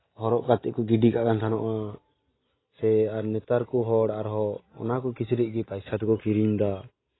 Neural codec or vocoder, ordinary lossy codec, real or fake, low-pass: none; AAC, 16 kbps; real; 7.2 kHz